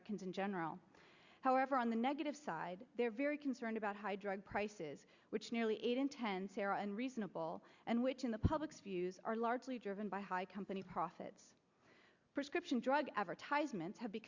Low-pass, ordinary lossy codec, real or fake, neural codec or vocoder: 7.2 kHz; Opus, 64 kbps; real; none